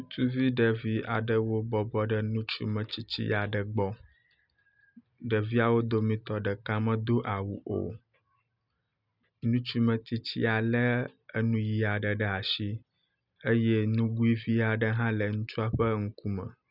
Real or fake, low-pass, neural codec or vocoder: real; 5.4 kHz; none